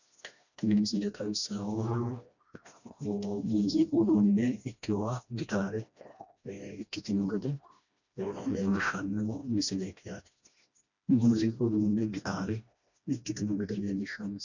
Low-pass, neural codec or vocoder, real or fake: 7.2 kHz; codec, 16 kHz, 1 kbps, FreqCodec, smaller model; fake